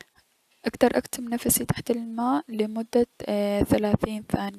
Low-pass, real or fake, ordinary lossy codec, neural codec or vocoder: 14.4 kHz; real; none; none